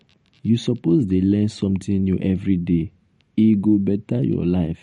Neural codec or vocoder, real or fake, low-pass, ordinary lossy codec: vocoder, 44.1 kHz, 128 mel bands every 512 samples, BigVGAN v2; fake; 19.8 kHz; MP3, 48 kbps